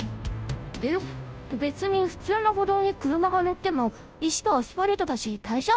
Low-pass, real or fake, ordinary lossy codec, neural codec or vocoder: none; fake; none; codec, 16 kHz, 0.5 kbps, FunCodec, trained on Chinese and English, 25 frames a second